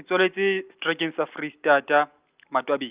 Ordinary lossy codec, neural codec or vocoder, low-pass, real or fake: Opus, 24 kbps; none; 3.6 kHz; real